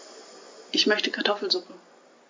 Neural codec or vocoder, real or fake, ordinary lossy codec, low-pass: none; real; MP3, 48 kbps; 7.2 kHz